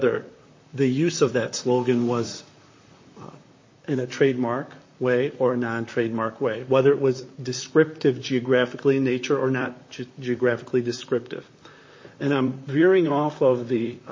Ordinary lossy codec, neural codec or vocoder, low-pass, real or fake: MP3, 32 kbps; vocoder, 44.1 kHz, 128 mel bands, Pupu-Vocoder; 7.2 kHz; fake